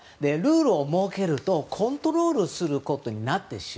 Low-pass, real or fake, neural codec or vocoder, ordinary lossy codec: none; real; none; none